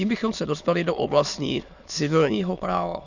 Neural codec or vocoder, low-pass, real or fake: autoencoder, 22.05 kHz, a latent of 192 numbers a frame, VITS, trained on many speakers; 7.2 kHz; fake